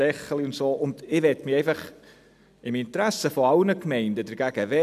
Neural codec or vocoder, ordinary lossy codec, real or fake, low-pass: none; none; real; 14.4 kHz